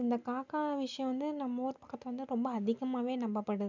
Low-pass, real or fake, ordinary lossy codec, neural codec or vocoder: 7.2 kHz; real; none; none